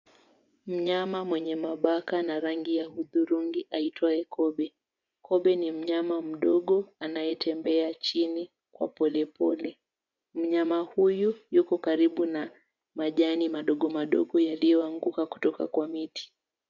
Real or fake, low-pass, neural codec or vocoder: fake; 7.2 kHz; vocoder, 24 kHz, 100 mel bands, Vocos